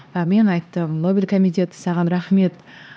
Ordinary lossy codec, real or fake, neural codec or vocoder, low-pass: none; fake; codec, 16 kHz, 2 kbps, X-Codec, HuBERT features, trained on LibriSpeech; none